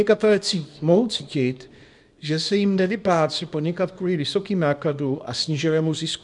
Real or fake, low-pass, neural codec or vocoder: fake; 10.8 kHz; codec, 24 kHz, 0.9 kbps, WavTokenizer, small release